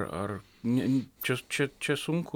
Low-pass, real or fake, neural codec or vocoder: 19.8 kHz; real; none